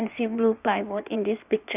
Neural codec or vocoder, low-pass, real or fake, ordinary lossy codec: codec, 16 kHz in and 24 kHz out, 1.1 kbps, FireRedTTS-2 codec; 3.6 kHz; fake; none